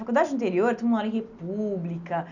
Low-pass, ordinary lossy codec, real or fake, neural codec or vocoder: 7.2 kHz; none; real; none